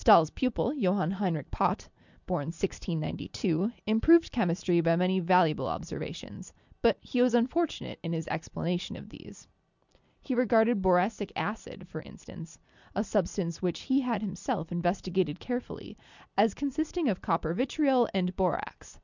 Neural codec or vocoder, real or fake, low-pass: none; real; 7.2 kHz